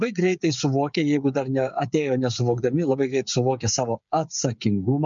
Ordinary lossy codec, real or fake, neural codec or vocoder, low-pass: MP3, 64 kbps; fake; codec, 16 kHz, 8 kbps, FreqCodec, smaller model; 7.2 kHz